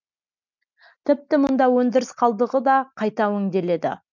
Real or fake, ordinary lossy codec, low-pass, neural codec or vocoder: real; none; 7.2 kHz; none